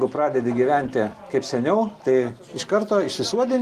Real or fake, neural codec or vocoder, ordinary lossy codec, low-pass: real; none; Opus, 24 kbps; 14.4 kHz